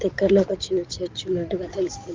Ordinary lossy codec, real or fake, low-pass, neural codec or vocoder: Opus, 32 kbps; fake; 7.2 kHz; codec, 16 kHz in and 24 kHz out, 2.2 kbps, FireRedTTS-2 codec